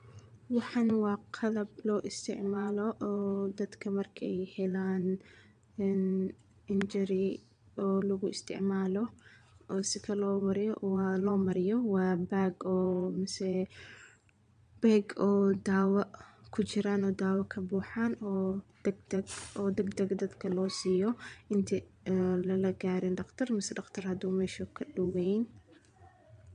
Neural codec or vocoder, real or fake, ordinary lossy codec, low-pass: vocoder, 22.05 kHz, 80 mel bands, Vocos; fake; MP3, 64 kbps; 9.9 kHz